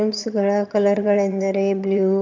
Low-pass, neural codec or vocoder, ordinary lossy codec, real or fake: 7.2 kHz; vocoder, 22.05 kHz, 80 mel bands, HiFi-GAN; AAC, 48 kbps; fake